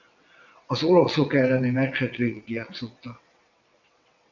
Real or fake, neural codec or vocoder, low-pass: fake; vocoder, 22.05 kHz, 80 mel bands, WaveNeXt; 7.2 kHz